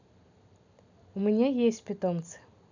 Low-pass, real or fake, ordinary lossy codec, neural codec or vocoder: 7.2 kHz; real; none; none